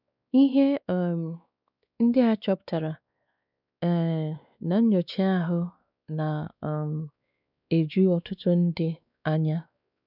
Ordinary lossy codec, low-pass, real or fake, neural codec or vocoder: none; 5.4 kHz; fake; codec, 16 kHz, 2 kbps, X-Codec, WavLM features, trained on Multilingual LibriSpeech